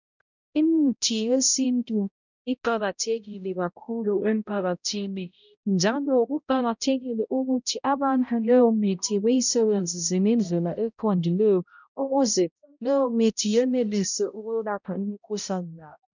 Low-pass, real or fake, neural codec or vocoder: 7.2 kHz; fake; codec, 16 kHz, 0.5 kbps, X-Codec, HuBERT features, trained on balanced general audio